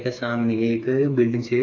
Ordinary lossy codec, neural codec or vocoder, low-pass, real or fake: AAC, 48 kbps; codec, 16 kHz, 4 kbps, FreqCodec, smaller model; 7.2 kHz; fake